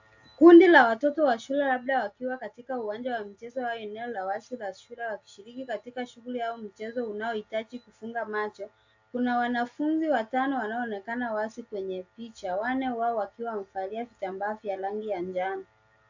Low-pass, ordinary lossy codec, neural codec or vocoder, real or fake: 7.2 kHz; AAC, 48 kbps; none; real